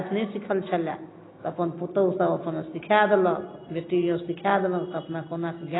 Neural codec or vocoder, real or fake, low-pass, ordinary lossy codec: none; real; 7.2 kHz; AAC, 16 kbps